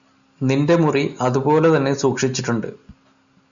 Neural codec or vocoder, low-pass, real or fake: none; 7.2 kHz; real